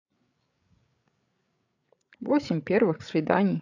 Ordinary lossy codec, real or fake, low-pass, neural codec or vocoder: AAC, 48 kbps; fake; 7.2 kHz; codec, 16 kHz, 16 kbps, FreqCodec, larger model